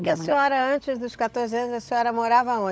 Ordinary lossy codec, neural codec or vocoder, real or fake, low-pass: none; codec, 16 kHz, 16 kbps, FunCodec, trained on LibriTTS, 50 frames a second; fake; none